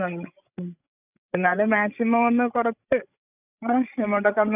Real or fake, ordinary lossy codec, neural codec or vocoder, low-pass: fake; none; codec, 16 kHz, 16 kbps, FreqCodec, larger model; 3.6 kHz